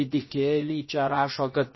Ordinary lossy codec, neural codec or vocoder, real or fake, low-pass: MP3, 24 kbps; codec, 16 kHz, about 1 kbps, DyCAST, with the encoder's durations; fake; 7.2 kHz